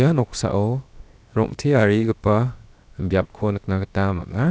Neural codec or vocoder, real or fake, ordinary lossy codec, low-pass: codec, 16 kHz, 0.7 kbps, FocalCodec; fake; none; none